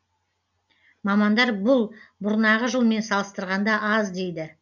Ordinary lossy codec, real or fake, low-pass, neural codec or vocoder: none; real; 7.2 kHz; none